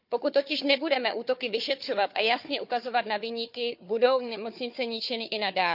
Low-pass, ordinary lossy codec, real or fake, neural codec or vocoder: 5.4 kHz; none; fake; codec, 16 kHz, 4 kbps, FunCodec, trained on Chinese and English, 50 frames a second